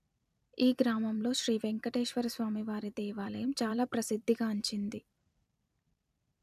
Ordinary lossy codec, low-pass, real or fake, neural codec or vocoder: none; 14.4 kHz; fake; vocoder, 44.1 kHz, 128 mel bands every 256 samples, BigVGAN v2